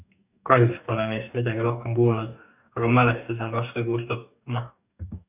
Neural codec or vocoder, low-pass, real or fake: codec, 44.1 kHz, 2.6 kbps, DAC; 3.6 kHz; fake